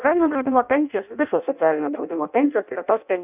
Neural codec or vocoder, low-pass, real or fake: codec, 16 kHz in and 24 kHz out, 0.6 kbps, FireRedTTS-2 codec; 3.6 kHz; fake